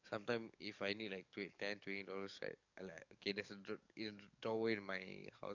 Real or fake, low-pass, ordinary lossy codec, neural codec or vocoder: fake; 7.2 kHz; none; codec, 44.1 kHz, 7.8 kbps, DAC